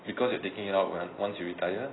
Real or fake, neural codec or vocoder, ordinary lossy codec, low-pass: real; none; AAC, 16 kbps; 7.2 kHz